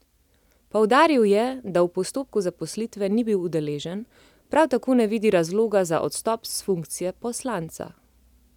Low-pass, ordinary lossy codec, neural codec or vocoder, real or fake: 19.8 kHz; none; none; real